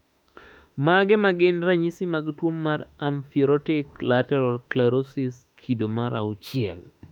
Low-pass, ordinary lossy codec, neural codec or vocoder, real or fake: 19.8 kHz; none; autoencoder, 48 kHz, 32 numbers a frame, DAC-VAE, trained on Japanese speech; fake